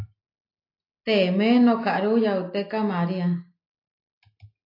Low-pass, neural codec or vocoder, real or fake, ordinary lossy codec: 5.4 kHz; none; real; AAC, 32 kbps